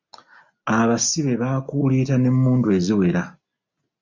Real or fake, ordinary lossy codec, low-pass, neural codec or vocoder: real; MP3, 64 kbps; 7.2 kHz; none